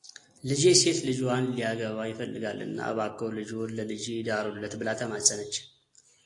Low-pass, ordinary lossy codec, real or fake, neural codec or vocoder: 10.8 kHz; AAC, 48 kbps; real; none